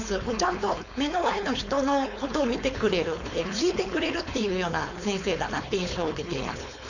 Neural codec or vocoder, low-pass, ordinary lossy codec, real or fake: codec, 16 kHz, 4.8 kbps, FACodec; 7.2 kHz; none; fake